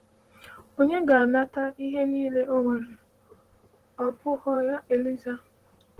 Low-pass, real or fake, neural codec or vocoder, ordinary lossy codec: 14.4 kHz; fake; vocoder, 44.1 kHz, 128 mel bands, Pupu-Vocoder; Opus, 16 kbps